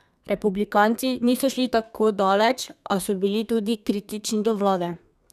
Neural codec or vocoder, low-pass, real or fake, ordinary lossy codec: codec, 32 kHz, 1.9 kbps, SNAC; 14.4 kHz; fake; none